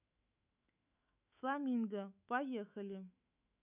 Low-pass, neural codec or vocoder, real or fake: 3.6 kHz; autoencoder, 48 kHz, 128 numbers a frame, DAC-VAE, trained on Japanese speech; fake